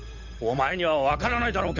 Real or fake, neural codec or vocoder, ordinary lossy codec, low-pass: fake; codec, 16 kHz, 8 kbps, FunCodec, trained on Chinese and English, 25 frames a second; none; 7.2 kHz